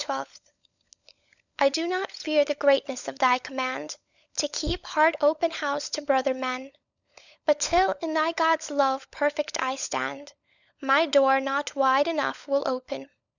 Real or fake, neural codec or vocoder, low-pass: fake; codec, 16 kHz, 16 kbps, FunCodec, trained on LibriTTS, 50 frames a second; 7.2 kHz